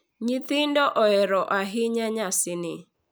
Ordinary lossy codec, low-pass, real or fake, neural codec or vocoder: none; none; real; none